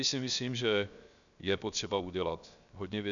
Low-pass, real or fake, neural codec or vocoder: 7.2 kHz; fake; codec, 16 kHz, 0.7 kbps, FocalCodec